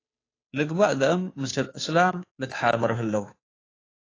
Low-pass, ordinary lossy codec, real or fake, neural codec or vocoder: 7.2 kHz; AAC, 32 kbps; fake; codec, 16 kHz, 2 kbps, FunCodec, trained on Chinese and English, 25 frames a second